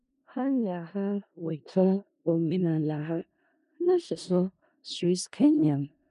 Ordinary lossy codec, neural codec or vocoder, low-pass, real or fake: none; codec, 16 kHz in and 24 kHz out, 0.4 kbps, LongCat-Audio-Codec, four codebook decoder; 10.8 kHz; fake